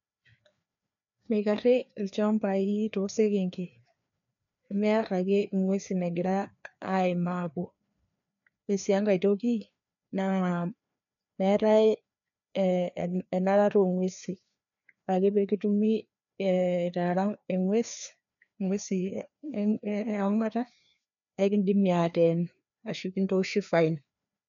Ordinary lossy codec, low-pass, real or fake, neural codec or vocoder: none; 7.2 kHz; fake; codec, 16 kHz, 2 kbps, FreqCodec, larger model